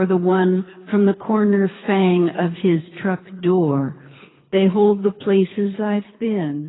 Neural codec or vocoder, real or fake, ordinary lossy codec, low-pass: codec, 24 kHz, 3 kbps, HILCodec; fake; AAC, 16 kbps; 7.2 kHz